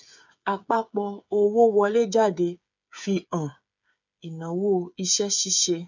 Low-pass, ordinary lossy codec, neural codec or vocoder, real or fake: 7.2 kHz; none; codec, 16 kHz, 8 kbps, FreqCodec, smaller model; fake